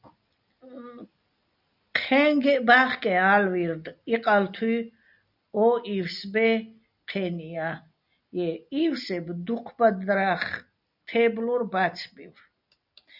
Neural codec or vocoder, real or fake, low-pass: none; real; 5.4 kHz